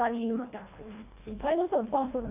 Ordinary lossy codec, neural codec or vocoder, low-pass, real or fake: none; codec, 24 kHz, 1.5 kbps, HILCodec; 3.6 kHz; fake